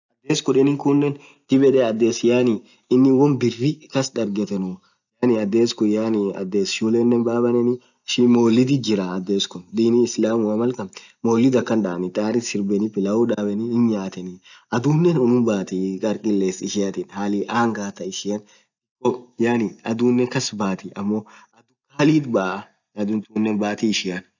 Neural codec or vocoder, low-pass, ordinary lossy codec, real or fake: none; 7.2 kHz; none; real